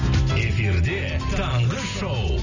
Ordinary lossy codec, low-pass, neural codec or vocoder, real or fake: none; 7.2 kHz; none; real